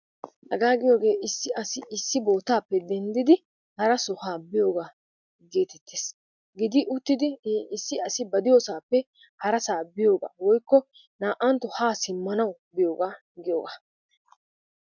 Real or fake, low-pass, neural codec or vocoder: real; 7.2 kHz; none